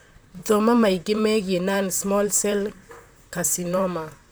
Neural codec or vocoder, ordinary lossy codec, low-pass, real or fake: vocoder, 44.1 kHz, 128 mel bands, Pupu-Vocoder; none; none; fake